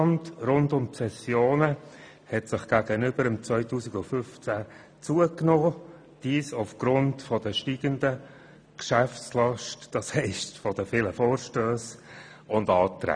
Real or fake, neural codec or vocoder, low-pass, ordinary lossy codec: real; none; 9.9 kHz; none